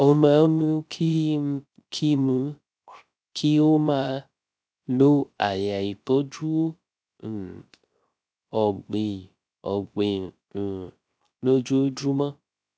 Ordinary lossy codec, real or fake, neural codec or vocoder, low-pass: none; fake; codec, 16 kHz, 0.3 kbps, FocalCodec; none